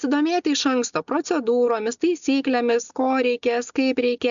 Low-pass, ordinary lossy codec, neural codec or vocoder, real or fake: 7.2 kHz; MP3, 96 kbps; none; real